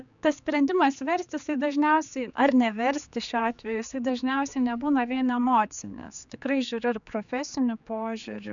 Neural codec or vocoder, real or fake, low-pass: codec, 16 kHz, 4 kbps, X-Codec, HuBERT features, trained on general audio; fake; 7.2 kHz